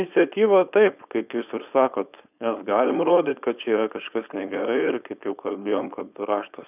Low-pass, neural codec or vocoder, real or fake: 3.6 kHz; codec, 16 kHz, 4.8 kbps, FACodec; fake